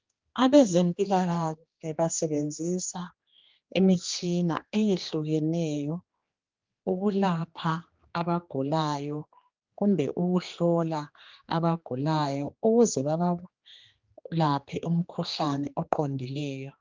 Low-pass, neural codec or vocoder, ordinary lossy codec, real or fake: 7.2 kHz; codec, 16 kHz, 2 kbps, X-Codec, HuBERT features, trained on general audio; Opus, 32 kbps; fake